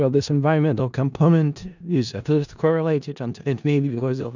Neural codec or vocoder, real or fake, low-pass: codec, 16 kHz in and 24 kHz out, 0.4 kbps, LongCat-Audio-Codec, four codebook decoder; fake; 7.2 kHz